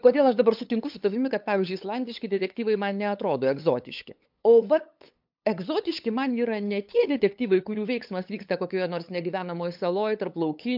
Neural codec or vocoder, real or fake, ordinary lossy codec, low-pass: codec, 16 kHz, 8 kbps, FunCodec, trained on LibriTTS, 25 frames a second; fake; MP3, 48 kbps; 5.4 kHz